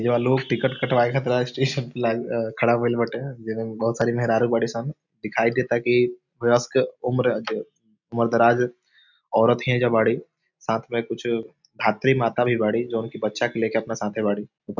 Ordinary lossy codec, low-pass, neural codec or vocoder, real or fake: none; 7.2 kHz; none; real